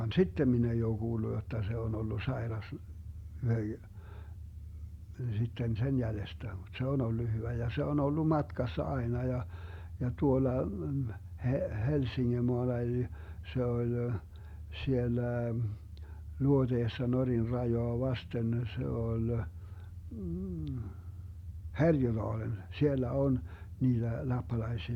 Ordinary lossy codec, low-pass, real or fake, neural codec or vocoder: Opus, 64 kbps; 19.8 kHz; real; none